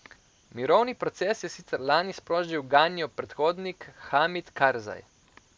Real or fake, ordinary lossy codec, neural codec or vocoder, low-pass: real; none; none; none